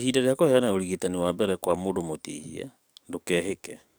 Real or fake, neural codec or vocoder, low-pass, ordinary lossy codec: fake; codec, 44.1 kHz, 7.8 kbps, DAC; none; none